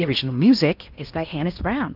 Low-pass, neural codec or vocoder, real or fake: 5.4 kHz; codec, 16 kHz in and 24 kHz out, 0.8 kbps, FocalCodec, streaming, 65536 codes; fake